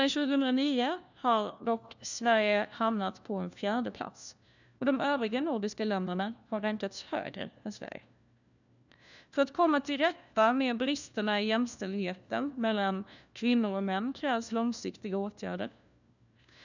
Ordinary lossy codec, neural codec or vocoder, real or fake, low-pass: none; codec, 16 kHz, 1 kbps, FunCodec, trained on LibriTTS, 50 frames a second; fake; 7.2 kHz